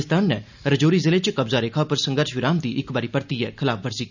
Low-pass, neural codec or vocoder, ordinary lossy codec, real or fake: 7.2 kHz; none; none; real